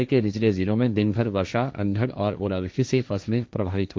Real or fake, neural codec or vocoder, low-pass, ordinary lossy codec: fake; codec, 16 kHz, 1.1 kbps, Voila-Tokenizer; none; none